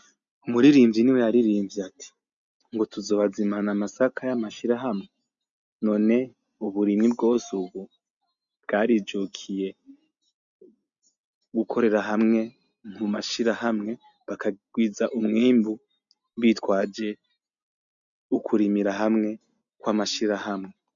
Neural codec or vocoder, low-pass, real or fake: none; 7.2 kHz; real